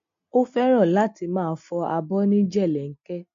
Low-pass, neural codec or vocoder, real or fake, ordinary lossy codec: 7.2 kHz; none; real; MP3, 48 kbps